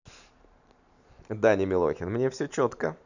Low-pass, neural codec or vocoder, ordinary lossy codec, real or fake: 7.2 kHz; vocoder, 44.1 kHz, 128 mel bands every 512 samples, BigVGAN v2; none; fake